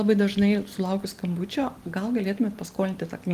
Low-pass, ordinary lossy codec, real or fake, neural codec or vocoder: 14.4 kHz; Opus, 24 kbps; real; none